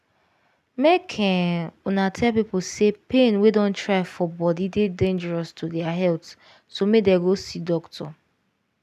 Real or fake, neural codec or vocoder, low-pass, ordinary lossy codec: real; none; 14.4 kHz; none